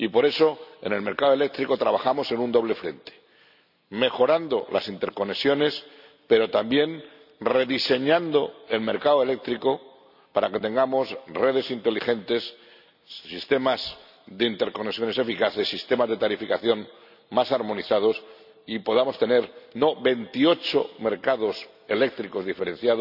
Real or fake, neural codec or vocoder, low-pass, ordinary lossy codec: real; none; 5.4 kHz; none